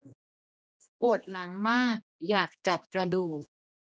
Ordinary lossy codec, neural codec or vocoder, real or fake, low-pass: none; codec, 16 kHz, 1 kbps, X-Codec, HuBERT features, trained on general audio; fake; none